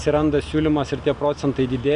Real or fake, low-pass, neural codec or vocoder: real; 9.9 kHz; none